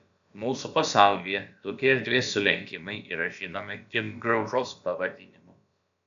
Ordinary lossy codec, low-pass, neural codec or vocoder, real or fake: AAC, 96 kbps; 7.2 kHz; codec, 16 kHz, about 1 kbps, DyCAST, with the encoder's durations; fake